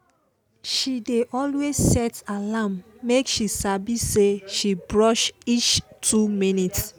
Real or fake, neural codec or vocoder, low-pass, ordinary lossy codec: real; none; none; none